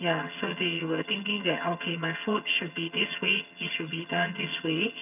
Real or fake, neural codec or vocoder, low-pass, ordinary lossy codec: fake; vocoder, 22.05 kHz, 80 mel bands, HiFi-GAN; 3.6 kHz; none